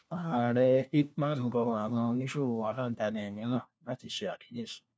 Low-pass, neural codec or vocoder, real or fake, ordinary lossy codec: none; codec, 16 kHz, 1 kbps, FunCodec, trained on LibriTTS, 50 frames a second; fake; none